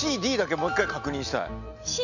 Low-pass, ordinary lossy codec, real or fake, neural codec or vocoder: 7.2 kHz; none; real; none